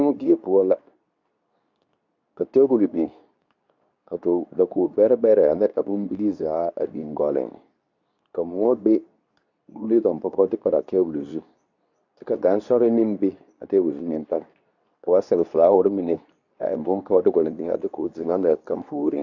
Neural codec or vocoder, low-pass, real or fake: codec, 24 kHz, 0.9 kbps, WavTokenizer, medium speech release version 2; 7.2 kHz; fake